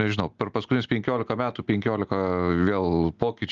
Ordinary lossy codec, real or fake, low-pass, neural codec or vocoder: Opus, 32 kbps; real; 7.2 kHz; none